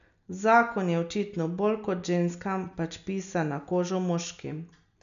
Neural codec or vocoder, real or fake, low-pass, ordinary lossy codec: none; real; 7.2 kHz; none